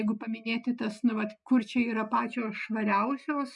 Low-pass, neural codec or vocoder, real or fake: 10.8 kHz; none; real